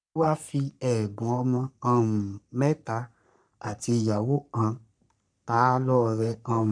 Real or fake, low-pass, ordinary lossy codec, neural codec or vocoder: fake; 9.9 kHz; none; codec, 44.1 kHz, 3.4 kbps, Pupu-Codec